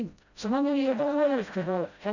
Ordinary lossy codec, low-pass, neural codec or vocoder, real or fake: none; 7.2 kHz; codec, 16 kHz, 0.5 kbps, FreqCodec, smaller model; fake